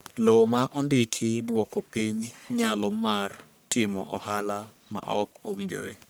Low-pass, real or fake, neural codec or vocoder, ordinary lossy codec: none; fake; codec, 44.1 kHz, 1.7 kbps, Pupu-Codec; none